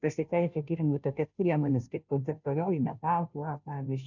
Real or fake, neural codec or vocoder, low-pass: fake; codec, 16 kHz, 0.5 kbps, FunCodec, trained on Chinese and English, 25 frames a second; 7.2 kHz